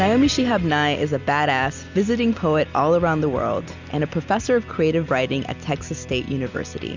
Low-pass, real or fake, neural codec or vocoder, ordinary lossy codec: 7.2 kHz; real; none; Opus, 64 kbps